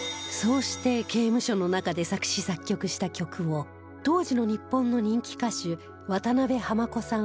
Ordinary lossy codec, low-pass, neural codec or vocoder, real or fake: none; none; none; real